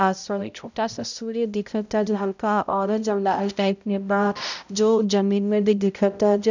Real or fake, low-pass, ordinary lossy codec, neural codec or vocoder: fake; 7.2 kHz; none; codec, 16 kHz, 0.5 kbps, X-Codec, HuBERT features, trained on balanced general audio